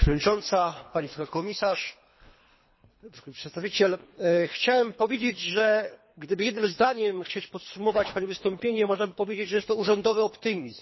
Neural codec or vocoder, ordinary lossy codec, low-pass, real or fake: codec, 24 kHz, 3 kbps, HILCodec; MP3, 24 kbps; 7.2 kHz; fake